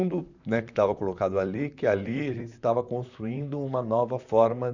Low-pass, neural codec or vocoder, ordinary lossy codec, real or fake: 7.2 kHz; vocoder, 44.1 kHz, 128 mel bands, Pupu-Vocoder; none; fake